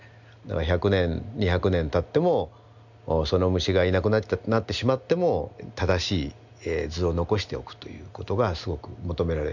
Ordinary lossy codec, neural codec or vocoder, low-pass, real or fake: none; none; 7.2 kHz; real